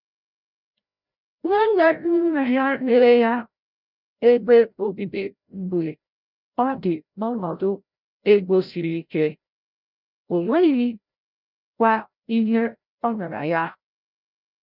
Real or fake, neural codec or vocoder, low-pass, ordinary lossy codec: fake; codec, 16 kHz, 0.5 kbps, FreqCodec, larger model; 5.4 kHz; none